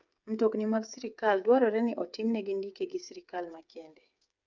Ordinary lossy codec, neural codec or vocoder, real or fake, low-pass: none; codec, 16 kHz, 8 kbps, FreqCodec, smaller model; fake; 7.2 kHz